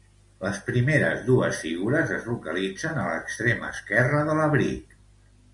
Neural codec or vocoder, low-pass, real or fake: none; 10.8 kHz; real